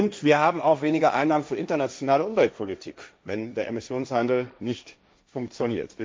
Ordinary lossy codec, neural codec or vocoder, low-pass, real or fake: none; codec, 16 kHz, 1.1 kbps, Voila-Tokenizer; none; fake